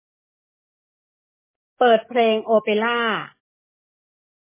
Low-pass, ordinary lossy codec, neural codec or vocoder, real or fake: 3.6 kHz; MP3, 16 kbps; codec, 44.1 kHz, 7.8 kbps, DAC; fake